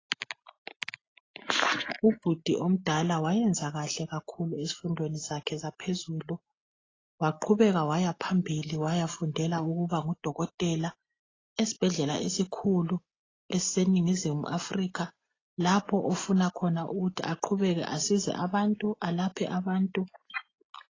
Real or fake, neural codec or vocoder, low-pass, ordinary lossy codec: real; none; 7.2 kHz; AAC, 32 kbps